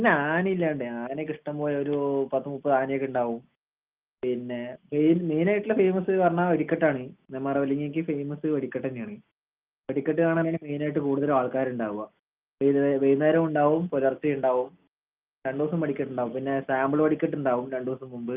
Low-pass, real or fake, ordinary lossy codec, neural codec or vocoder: 3.6 kHz; real; Opus, 24 kbps; none